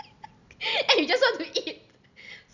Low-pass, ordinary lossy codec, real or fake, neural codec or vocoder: 7.2 kHz; none; real; none